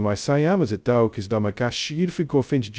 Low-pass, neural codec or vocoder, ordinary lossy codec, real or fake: none; codec, 16 kHz, 0.2 kbps, FocalCodec; none; fake